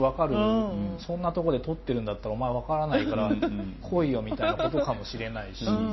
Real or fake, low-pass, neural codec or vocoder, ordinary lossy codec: real; 7.2 kHz; none; MP3, 24 kbps